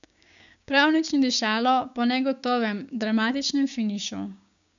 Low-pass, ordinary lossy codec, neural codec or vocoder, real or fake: 7.2 kHz; none; codec, 16 kHz, 6 kbps, DAC; fake